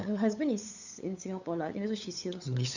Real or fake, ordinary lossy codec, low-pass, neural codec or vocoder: fake; none; 7.2 kHz; codec, 16 kHz, 8 kbps, FunCodec, trained on LibriTTS, 25 frames a second